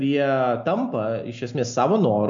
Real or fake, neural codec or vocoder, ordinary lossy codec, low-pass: real; none; MP3, 48 kbps; 7.2 kHz